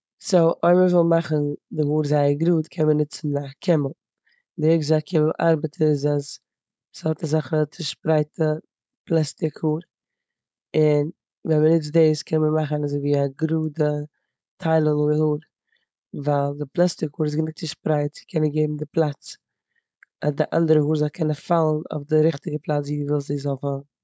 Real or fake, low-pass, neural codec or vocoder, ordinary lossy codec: fake; none; codec, 16 kHz, 4.8 kbps, FACodec; none